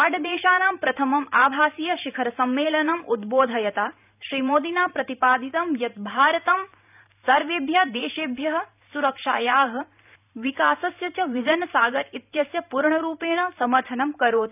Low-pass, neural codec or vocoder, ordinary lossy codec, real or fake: 3.6 kHz; vocoder, 44.1 kHz, 128 mel bands every 512 samples, BigVGAN v2; none; fake